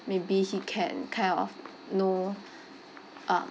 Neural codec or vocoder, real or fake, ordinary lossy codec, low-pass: none; real; none; none